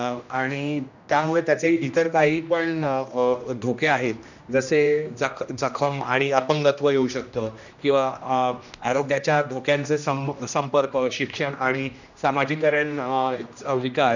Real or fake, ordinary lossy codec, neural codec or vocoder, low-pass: fake; none; codec, 16 kHz, 1 kbps, X-Codec, HuBERT features, trained on general audio; 7.2 kHz